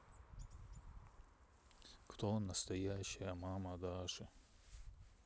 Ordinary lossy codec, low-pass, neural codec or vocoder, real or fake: none; none; none; real